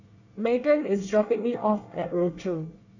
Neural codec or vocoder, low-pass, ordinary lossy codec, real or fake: codec, 24 kHz, 1 kbps, SNAC; 7.2 kHz; none; fake